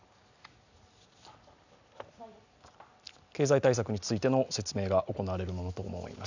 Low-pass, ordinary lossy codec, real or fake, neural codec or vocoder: 7.2 kHz; none; real; none